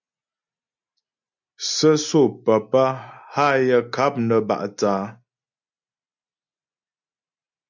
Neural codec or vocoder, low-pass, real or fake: none; 7.2 kHz; real